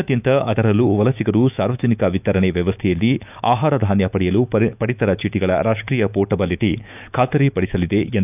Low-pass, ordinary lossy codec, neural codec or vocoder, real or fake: 3.6 kHz; none; autoencoder, 48 kHz, 128 numbers a frame, DAC-VAE, trained on Japanese speech; fake